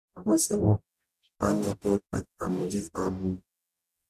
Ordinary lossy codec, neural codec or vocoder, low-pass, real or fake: none; codec, 44.1 kHz, 0.9 kbps, DAC; 14.4 kHz; fake